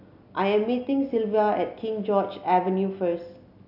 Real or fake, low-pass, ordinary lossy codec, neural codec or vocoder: real; 5.4 kHz; none; none